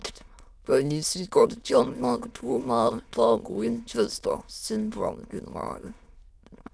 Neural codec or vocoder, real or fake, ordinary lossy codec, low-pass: autoencoder, 22.05 kHz, a latent of 192 numbers a frame, VITS, trained on many speakers; fake; none; none